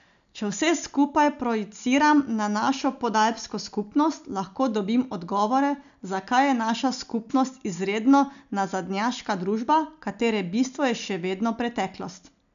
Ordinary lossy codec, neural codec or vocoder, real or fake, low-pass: none; none; real; 7.2 kHz